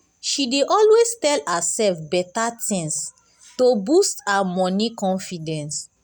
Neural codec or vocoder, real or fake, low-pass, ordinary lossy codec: none; real; none; none